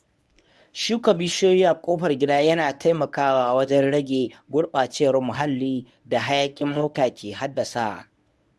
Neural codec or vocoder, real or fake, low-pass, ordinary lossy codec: codec, 24 kHz, 0.9 kbps, WavTokenizer, medium speech release version 1; fake; none; none